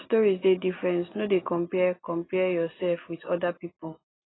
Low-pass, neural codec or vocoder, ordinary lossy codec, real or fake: 7.2 kHz; none; AAC, 16 kbps; real